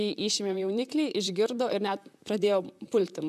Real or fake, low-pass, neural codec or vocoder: fake; 14.4 kHz; vocoder, 44.1 kHz, 128 mel bands, Pupu-Vocoder